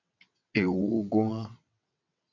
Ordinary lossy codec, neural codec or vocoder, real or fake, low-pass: MP3, 64 kbps; vocoder, 22.05 kHz, 80 mel bands, WaveNeXt; fake; 7.2 kHz